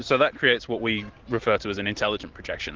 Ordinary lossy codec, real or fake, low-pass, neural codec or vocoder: Opus, 16 kbps; real; 7.2 kHz; none